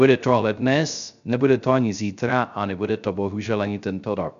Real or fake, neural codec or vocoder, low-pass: fake; codec, 16 kHz, 0.3 kbps, FocalCodec; 7.2 kHz